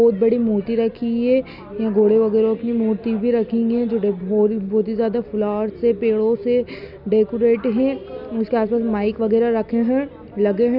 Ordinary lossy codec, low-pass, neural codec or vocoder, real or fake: none; 5.4 kHz; none; real